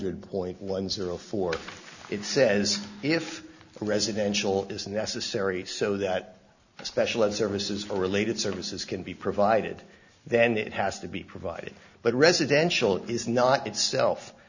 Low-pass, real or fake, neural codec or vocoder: 7.2 kHz; real; none